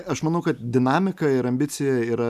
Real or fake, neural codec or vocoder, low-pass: real; none; 14.4 kHz